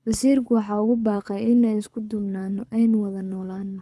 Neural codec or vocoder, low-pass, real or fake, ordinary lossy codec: codec, 24 kHz, 6 kbps, HILCodec; none; fake; none